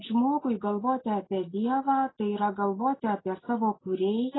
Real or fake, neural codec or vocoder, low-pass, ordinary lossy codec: real; none; 7.2 kHz; AAC, 16 kbps